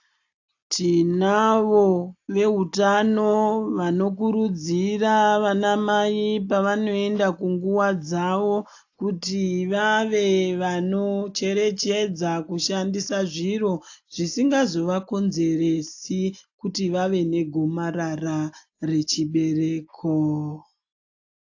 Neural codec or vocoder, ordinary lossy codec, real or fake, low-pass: none; AAC, 48 kbps; real; 7.2 kHz